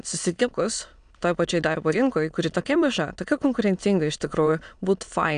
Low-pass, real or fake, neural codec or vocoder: 9.9 kHz; fake; autoencoder, 22.05 kHz, a latent of 192 numbers a frame, VITS, trained on many speakers